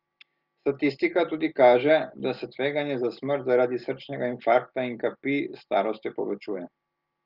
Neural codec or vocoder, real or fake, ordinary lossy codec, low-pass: none; real; Opus, 32 kbps; 5.4 kHz